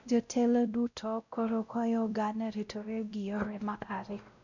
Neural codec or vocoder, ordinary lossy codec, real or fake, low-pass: codec, 16 kHz, 0.5 kbps, X-Codec, WavLM features, trained on Multilingual LibriSpeech; none; fake; 7.2 kHz